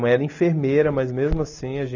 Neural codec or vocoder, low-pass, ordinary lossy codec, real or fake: none; 7.2 kHz; none; real